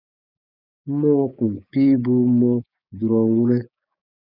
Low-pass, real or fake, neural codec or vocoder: 5.4 kHz; fake; codec, 16 kHz, 6 kbps, DAC